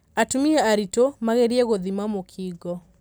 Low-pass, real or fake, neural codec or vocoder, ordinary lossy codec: none; real; none; none